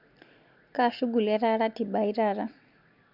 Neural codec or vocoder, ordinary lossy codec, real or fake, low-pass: none; Opus, 64 kbps; real; 5.4 kHz